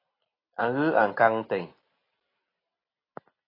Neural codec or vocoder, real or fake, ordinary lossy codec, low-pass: none; real; AAC, 24 kbps; 5.4 kHz